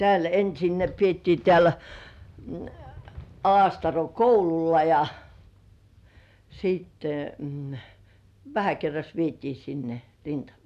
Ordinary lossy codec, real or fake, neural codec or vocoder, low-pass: none; real; none; 14.4 kHz